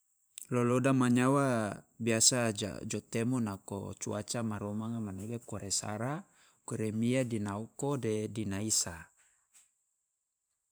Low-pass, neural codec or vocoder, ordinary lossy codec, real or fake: none; vocoder, 44.1 kHz, 128 mel bands every 512 samples, BigVGAN v2; none; fake